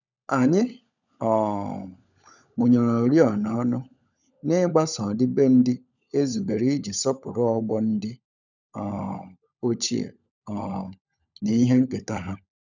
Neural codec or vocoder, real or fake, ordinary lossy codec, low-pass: codec, 16 kHz, 16 kbps, FunCodec, trained on LibriTTS, 50 frames a second; fake; none; 7.2 kHz